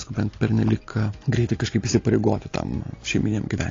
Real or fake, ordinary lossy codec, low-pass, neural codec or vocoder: real; AAC, 32 kbps; 7.2 kHz; none